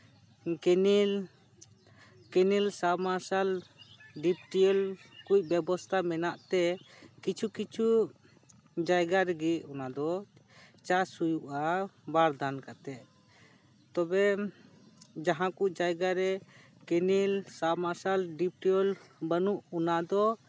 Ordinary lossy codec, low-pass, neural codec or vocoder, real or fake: none; none; none; real